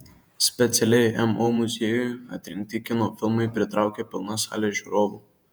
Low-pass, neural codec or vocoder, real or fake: 19.8 kHz; vocoder, 48 kHz, 128 mel bands, Vocos; fake